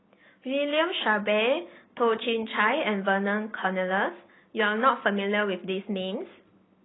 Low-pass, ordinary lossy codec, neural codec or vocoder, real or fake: 7.2 kHz; AAC, 16 kbps; none; real